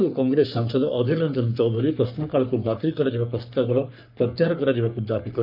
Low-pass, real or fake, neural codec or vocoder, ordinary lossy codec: 5.4 kHz; fake; codec, 44.1 kHz, 3.4 kbps, Pupu-Codec; none